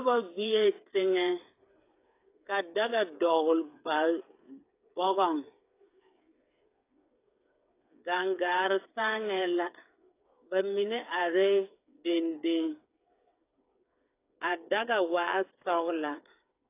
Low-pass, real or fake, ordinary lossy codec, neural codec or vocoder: 3.6 kHz; fake; AAC, 24 kbps; codec, 16 kHz, 8 kbps, FreqCodec, smaller model